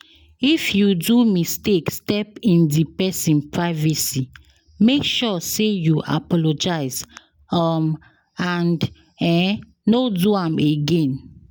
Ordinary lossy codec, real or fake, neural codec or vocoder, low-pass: none; real; none; none